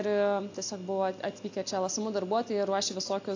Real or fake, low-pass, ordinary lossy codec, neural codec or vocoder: real; 7.2 kHz; AAC, 48 kbps; none